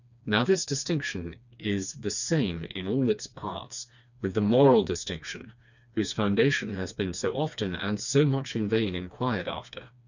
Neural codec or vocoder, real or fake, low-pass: codec, 16 kHz, 2 kbps, FreqCodec, smaller model; fake; 7.2 kHz